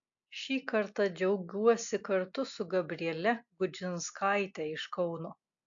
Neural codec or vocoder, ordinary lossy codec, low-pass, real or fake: none; AAC, 64 kbps; 7.2 kHz; real